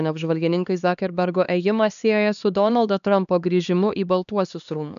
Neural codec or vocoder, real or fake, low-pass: codec, 16 kHz, 2 kbps, X-Codec, HuBERT features, trained on LibriSpeech; fake; 7.2 kHz